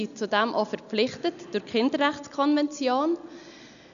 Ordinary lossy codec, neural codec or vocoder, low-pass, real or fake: none; none; 7.2 kHz; real